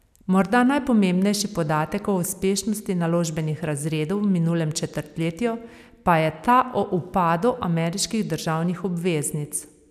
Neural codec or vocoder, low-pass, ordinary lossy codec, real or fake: none; 14.4 kHz; none; real